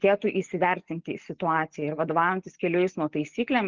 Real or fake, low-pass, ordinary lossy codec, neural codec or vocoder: real; 7.2 kHz; Opus, 32 kbps; none